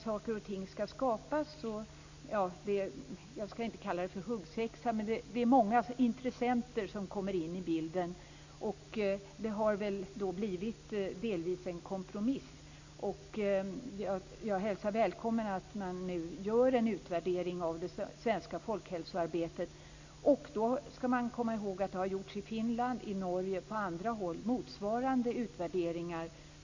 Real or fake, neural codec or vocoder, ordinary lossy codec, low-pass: real; none; AAC, 48 kbps; 7.2 kHz